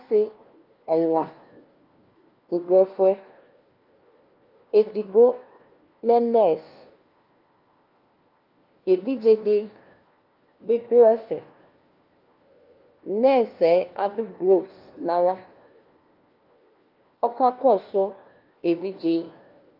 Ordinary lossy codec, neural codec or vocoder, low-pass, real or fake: Opus, 24 kbps; codec, 16 kHz, 1 kbps, FunCodec, trained on LibriTTS, 50 frames a second; 5.4 kHz; fake